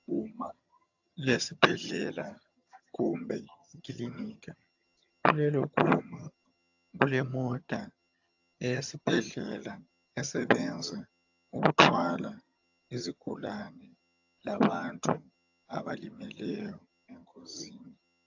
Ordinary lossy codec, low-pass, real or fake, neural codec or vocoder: AAC, 48 kbps; 7.2 kHz; fake; vocoder, 22.05 kHz, 80 mel bands, HiFi-GAN